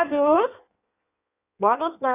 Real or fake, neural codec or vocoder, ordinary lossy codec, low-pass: fake; codec, 16 kHz in and 24 kHz out, 1.1 kbps, FireRedTTS-2 codec; none; 3.6 kHz